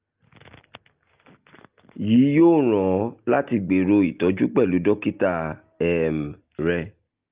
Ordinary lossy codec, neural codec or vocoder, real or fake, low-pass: Opus, 32 kbps; none; real; 3.6 kHz